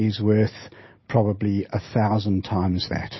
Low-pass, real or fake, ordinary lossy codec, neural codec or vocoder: 7.2 kHz; real; MP3, 24 kbps; none